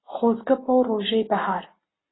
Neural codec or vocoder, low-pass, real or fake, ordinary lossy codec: none; 7.2 kHz; real; AAC, 16 kbps